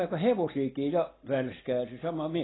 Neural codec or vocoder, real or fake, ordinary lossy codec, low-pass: none; real; AAC, 16 kbps; 7.2 kHz